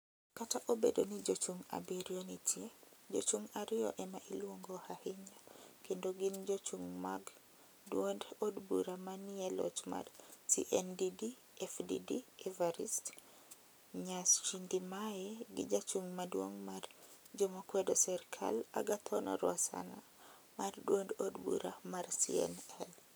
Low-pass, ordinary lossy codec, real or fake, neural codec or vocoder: none; none; real; none